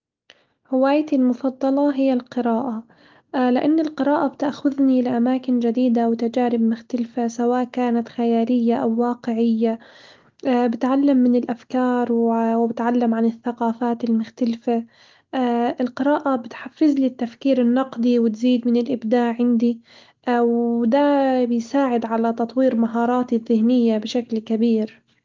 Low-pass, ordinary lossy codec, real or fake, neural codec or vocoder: 7.2 kHz; Opus, 32 kbps; real; none